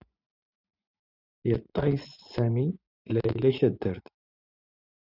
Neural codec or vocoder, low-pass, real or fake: vocoder, 24 kHz, 100 mel bands, Vocos; 5.4 kHz; fake